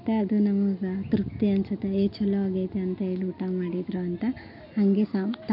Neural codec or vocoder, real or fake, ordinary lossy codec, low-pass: none; real; none; 5.4 kHz